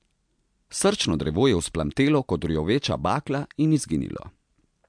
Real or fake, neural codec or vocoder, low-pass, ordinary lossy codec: real; none; 9.9 kHz; MP3, 64 kbps